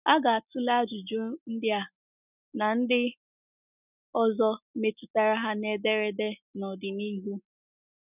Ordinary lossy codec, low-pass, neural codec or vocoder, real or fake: none; 3.6 kHz; none; real